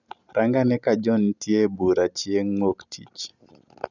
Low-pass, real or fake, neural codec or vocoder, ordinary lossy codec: 7.2 kHz; real; none; none